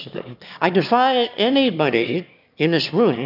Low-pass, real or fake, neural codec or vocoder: 5.4 kHz; fake; autoencoder, 22.05 kHz, a latent of 192 numbers a frame, VITS, trained on one speaker